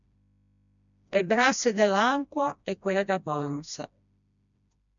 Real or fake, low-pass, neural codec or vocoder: fake; 7.2 kHz; codec, 16 kHz, 1 kbps, FreqCodec, smaller model